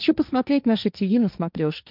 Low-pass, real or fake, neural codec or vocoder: 5.4 kHz; fake; codec, 16 kHz, 1.1 kbps, Voila-Tokenizer